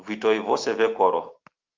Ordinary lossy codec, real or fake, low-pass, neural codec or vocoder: Opus, 32 kbps; real; 7.2 kHz; none